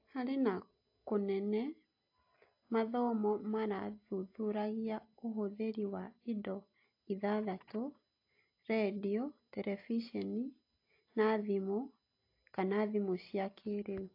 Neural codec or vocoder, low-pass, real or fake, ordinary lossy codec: none; 5.4 kHz; real; AAC, 32 kbps